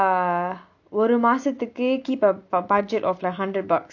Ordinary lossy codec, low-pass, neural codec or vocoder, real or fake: none; 7.2 kHz; none; real